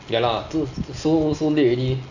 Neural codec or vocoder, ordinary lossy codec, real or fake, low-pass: none; none; real; 7.2 kHz